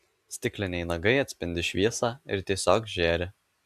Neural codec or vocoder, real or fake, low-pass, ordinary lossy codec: none; real; 14.4 kHz; AAC, 96 kbps